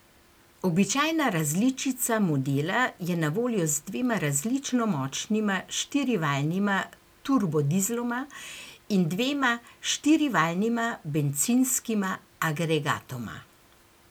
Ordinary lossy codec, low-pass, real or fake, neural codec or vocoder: none; none; real; none